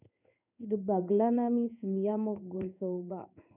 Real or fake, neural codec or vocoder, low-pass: fake; codec, 16 kHz in and 24 kHz out, 1 kbps, XY-Tokenizer; 3.6 kHz